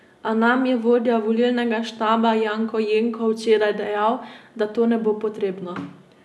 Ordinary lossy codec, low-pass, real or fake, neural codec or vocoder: none; none; real; none